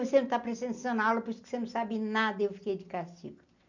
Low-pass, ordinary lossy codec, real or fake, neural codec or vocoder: 7.2 kHz; none; real; none